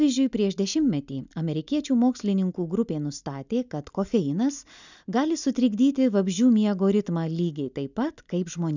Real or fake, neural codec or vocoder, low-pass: real; none; 7.2 kHz